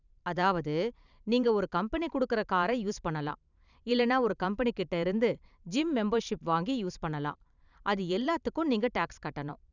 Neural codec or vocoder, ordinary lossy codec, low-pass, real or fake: vocoder, 44.1 kHz, 128 mel bands every 512 samples, BigVGAN v2; none; 7.2 kHz; fake